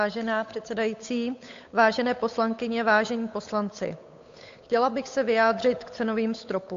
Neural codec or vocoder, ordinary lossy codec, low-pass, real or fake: codec, 16 kHz, 8 kbps, FunCodec, trained on Chinese and English, 25 frames a second; AAC, 48 kbps; 7.2 kHz; fake